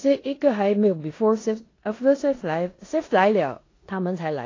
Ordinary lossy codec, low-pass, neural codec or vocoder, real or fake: AAC, 32 kbps; 7.2 kHz; codec, 16 kHz in and 24 kHz out, 0.9 kbps, LongCat-Audio-Codec, four codebook decoder; fake